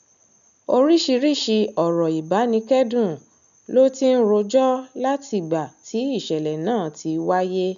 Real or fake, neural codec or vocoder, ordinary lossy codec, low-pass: real; none; none; 7.2 kHz